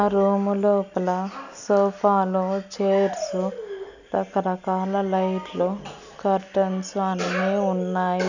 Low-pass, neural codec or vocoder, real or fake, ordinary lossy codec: 7.2 kHz; none; real; none